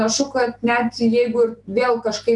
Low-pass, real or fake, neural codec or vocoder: 10.8 kHz; real; none